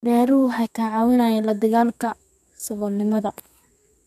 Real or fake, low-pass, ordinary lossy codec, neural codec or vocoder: fake; 14.4 kHz; none; codec, 32 kHz, 1.9 kbps, SNAC